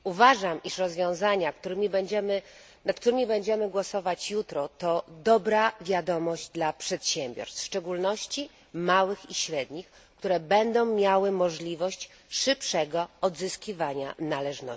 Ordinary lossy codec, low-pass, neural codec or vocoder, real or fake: none; none; none; real